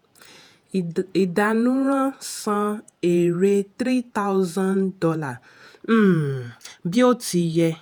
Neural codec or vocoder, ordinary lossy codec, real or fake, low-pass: vocoder, 48 kHz, 128 mel bands, Vocos; none; fake; none